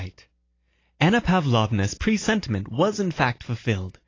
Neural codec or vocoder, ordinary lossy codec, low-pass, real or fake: none; AAC, 32 kbps; 7.2 kHz; real